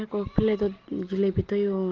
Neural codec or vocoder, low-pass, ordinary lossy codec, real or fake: none; 7.2 kHz; Opus, 16 kbps; real